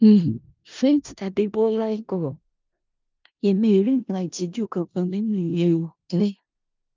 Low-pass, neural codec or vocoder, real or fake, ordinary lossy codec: 7.2 kHz; codec, 16 kHz in and 24 kHz out, 0.4 kbps, LongCat-Audio-Codec, four codebook decoder; fake; Opus, 24 kbps